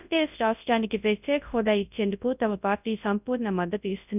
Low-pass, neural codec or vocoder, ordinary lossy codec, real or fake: 3.6 kHz; codec, 24 kHz, 0.9 kbps, WavTokenizer, large speech release; none; fake